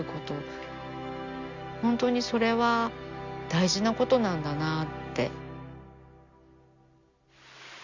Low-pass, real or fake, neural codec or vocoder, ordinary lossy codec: 7.2 kHz; real; none; Opus, 64 kbps